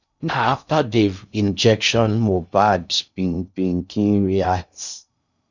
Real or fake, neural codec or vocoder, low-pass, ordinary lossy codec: fake; codec, 16 kHz in and 24 kHz out, 0.6 kbps, FocalCodec, streaming, 2048 codes; 7.2 kHz; none